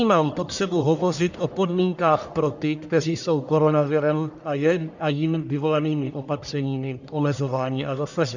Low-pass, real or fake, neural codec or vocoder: 7.2 kHz; fake; codec, 44.1 kHz, 1.7 kbps, Pupu-Codec